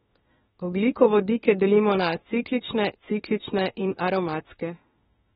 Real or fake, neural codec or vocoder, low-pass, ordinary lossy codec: fake; autoencoder, 48 kHz, 32 numbers a frame, DAC-VAE, trained on Japanese speech; 19.8 kHz; AAC, 16 kbps